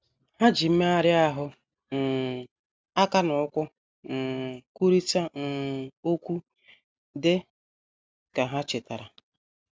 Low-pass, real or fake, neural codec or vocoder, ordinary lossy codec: none; real; none; none